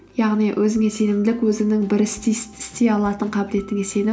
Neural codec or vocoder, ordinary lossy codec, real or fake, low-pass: none; none; real; none